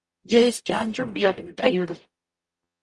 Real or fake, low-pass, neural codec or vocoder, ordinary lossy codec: fake; 10.8 kHz; codec, 44.1 kHz, 0.9 kbps, DAC; Opus, 32 kbps